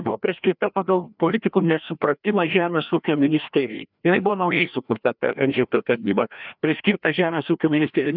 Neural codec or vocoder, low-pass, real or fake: codec, 16 kHz, 1 kbps, FreqCodec, larger model; 5.4 kHz; fake